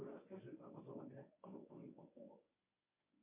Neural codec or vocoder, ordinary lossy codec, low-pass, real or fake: codec, 24 kHz, 0.9 kbps, WavTokenizer, medium speech release version 1; MP3, 32 kbps; 3.6 kHz; fake